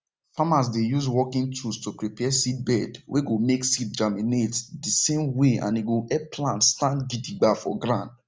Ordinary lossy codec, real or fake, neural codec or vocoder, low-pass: none; real; none; none